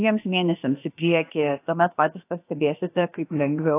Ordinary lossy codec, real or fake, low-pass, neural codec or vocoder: AAC, 24 kbps; fake; 3.6 kHz; codec, 16 kHz, about 1 kbps, DyCAST, with the encoder's durations